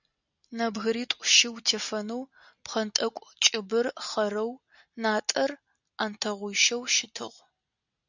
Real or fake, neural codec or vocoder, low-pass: real; none; 7.2 kHz